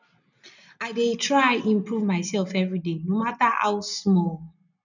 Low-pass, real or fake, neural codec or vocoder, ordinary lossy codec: 7.2 kHz; real; none; none